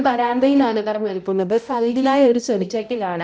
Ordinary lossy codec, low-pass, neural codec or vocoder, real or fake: none; none; codec, 16 kHz, 0.5 kbps, X-Codec, HuBERT features, trained on balanced general audio; fake